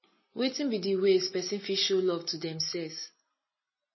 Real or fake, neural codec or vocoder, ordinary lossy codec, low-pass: real; none; MP3, 24 kbps; 7.2 kHz